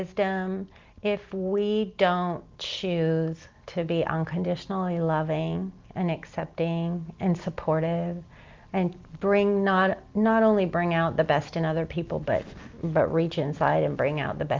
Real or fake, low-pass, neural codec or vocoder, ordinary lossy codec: real; 7.2 kHz; none; Opus, 32 kbps